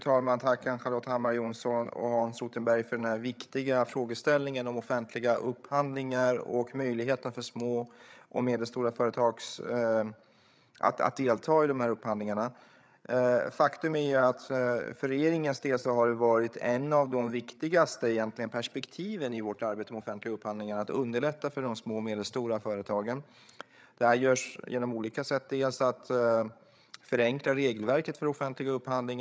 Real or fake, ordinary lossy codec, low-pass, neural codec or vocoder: fake; none; none; codec, 16 kHz, 8 kbps, FreqCodec, larger model